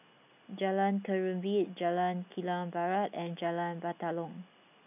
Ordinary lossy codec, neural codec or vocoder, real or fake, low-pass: none; none; real; 3.6 kHz